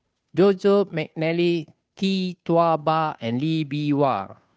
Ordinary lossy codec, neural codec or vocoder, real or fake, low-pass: none; codec, 16 kHz, 2 kbps, FunCodec, trained on Chinese and English, 25 frames a second; fake; none